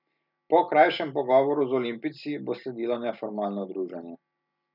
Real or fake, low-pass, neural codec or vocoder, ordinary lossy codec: real; 5.4 kHz; none; none